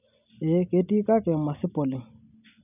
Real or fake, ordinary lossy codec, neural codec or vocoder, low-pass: real; none; none; 3.6 kHz